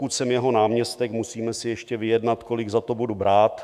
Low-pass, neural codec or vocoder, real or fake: 14.4 kHz; autoencoder, 48 kHz, 128 numbers a frame, DAC-VAE, trained on Japanese speech; fake